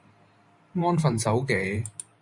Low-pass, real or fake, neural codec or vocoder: 10.8 kHz; fake; vocoder, 44.1 kHz, 128 mel bands every 512 samples, BigVGAN v2